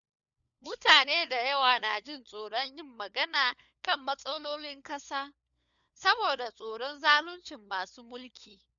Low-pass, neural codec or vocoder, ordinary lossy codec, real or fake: 7.2 kHz; codec, 16 kHz, 4 kbps, FunCodec, trained on LibriTTS, 50 frames a second; none; fake